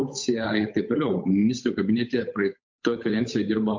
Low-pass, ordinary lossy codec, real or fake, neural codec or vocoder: 7.2 kHz; MP3, 48 kbps; fake; codec, 16 kHz, 8 kbps, FunCodec, trained on Chinese and English, 25 frames a second